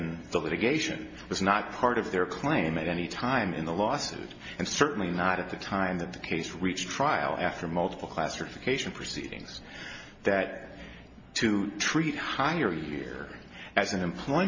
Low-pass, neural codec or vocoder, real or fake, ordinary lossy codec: 7.2 kHz; none; real; MP3, 32 kbps